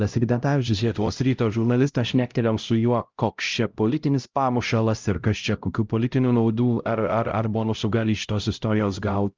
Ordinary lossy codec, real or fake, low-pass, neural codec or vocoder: Opus, 24 kbps; fake; 7.2 kHz; codec, 16 kHz, 0.5 kbps, X-Codec, HuBERT features, trained on LibriSpeech